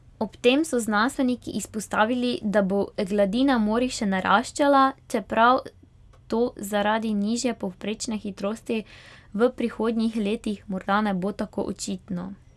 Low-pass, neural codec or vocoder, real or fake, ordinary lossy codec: none; none; real; none